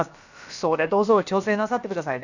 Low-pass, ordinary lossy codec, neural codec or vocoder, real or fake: 7.2 kHz; none; codec, 16 kHz, about 1 kbps, DyCAST, with the encoder's durations; fake